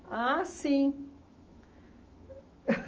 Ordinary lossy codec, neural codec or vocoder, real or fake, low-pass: Opus, 24 kbps; autoencoder, 48 kHz, 128 numbers a frame, DAC-VAE, trained on Japanese speech; fake; 7.2 kHz